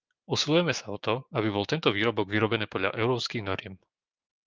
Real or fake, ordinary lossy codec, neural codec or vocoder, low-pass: fake; Opus, 24 kbps; codec, 16 kHz, 6 kbps, DAC; 7.2 kHz